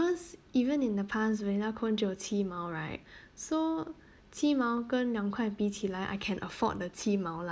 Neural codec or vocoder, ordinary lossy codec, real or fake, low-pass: none; none; real; none